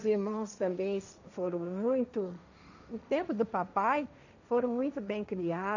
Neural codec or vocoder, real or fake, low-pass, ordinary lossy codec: codec, 16 kHz, 1.1 kbps, Voila-Tokenizer; fake; 7.2 kHz; none